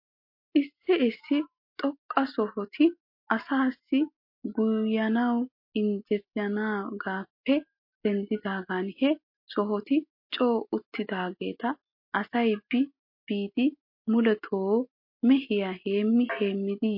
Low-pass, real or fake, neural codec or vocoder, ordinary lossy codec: 5.4 kHz; real; none; MP3, 32 kbps